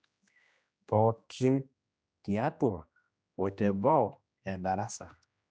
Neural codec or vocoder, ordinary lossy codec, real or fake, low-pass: codec, 16 kHz, 1 kbps, X-Codec, HuBERT features, trained on general audio; none; fake; none